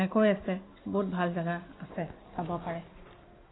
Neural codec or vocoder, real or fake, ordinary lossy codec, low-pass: none; real; AAC, 16 kbps; 7.2 kHz